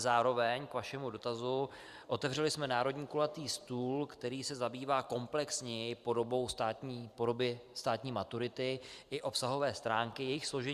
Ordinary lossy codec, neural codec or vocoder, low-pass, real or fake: Opus, 64 kbps; vocoder, 44.1 kHz, 128 mel bands every 512 samples, BigVGAN v2; 14.4 kHz; fake